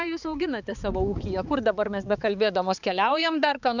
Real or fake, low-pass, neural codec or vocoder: fake; 7.2 kHz; codec, 16 kHz, 4 kbps, X-Codec, HuBERT features, trained on balanced general audio